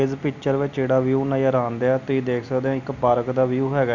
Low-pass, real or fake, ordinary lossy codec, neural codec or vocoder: 7.2 kHz; real; none; none